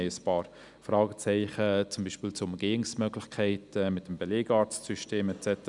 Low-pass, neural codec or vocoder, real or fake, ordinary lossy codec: 10.8 kHz; none; real; none